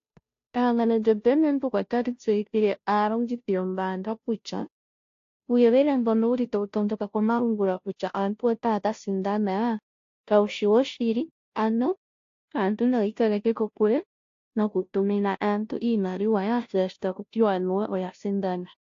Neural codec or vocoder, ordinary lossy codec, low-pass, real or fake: codec, 16 kHz, 0.5 kbps, FunCodec, trained on Chinese and English, 25 frames a second; AAC, 48 kbps; 7.2 kHz; fake